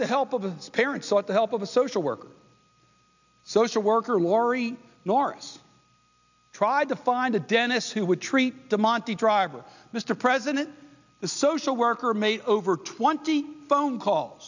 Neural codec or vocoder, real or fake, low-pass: none; real; 7.2 kHz